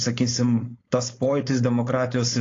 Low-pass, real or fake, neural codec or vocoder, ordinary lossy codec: 7.2 kHz; fake; codec, 16 kHz, 4.8 kbps, FACodec; AAC, 32 kbps